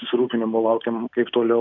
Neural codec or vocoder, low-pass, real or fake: none; 7.2 kHz; real